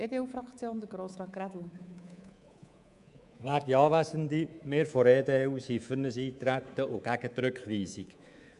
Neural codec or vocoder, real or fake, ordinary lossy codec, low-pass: codec, 24 kHz, 3.1 kbps, DualCodec; fake; none; 10.8 kHz